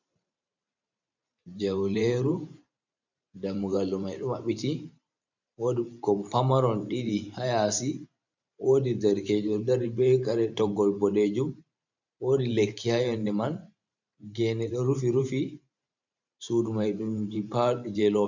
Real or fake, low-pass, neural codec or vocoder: fake; 7.2 kHz; vocoder, 44.1 kHz, 128 mel bands every 512 samples, BigVGAN v2